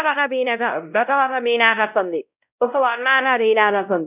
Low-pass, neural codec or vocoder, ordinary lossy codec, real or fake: 3.6 kHz; codec, 16 kHz, 0.5 kbps, X-Codec, WavLM features, trained on Multilingual LibriSpeech; none; fake